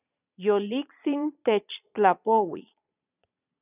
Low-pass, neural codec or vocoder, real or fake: 3.6 kHz; vocoder, 22.05 kHz, 80 mel bands, Vocos; fake